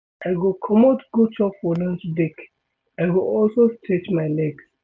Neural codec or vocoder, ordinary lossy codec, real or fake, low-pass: none; none; real; none